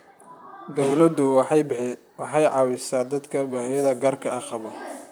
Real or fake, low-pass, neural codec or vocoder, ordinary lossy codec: fake; none; vocoder, 44.1 kHz, 128 mel bands, Pupu-Vocoder; none